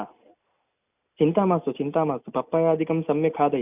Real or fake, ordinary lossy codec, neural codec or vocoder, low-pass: real; none; none; 3.6 kHz